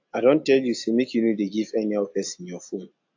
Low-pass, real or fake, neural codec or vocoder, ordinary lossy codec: 7.2 kHz; real; none; AAC, 48 kbps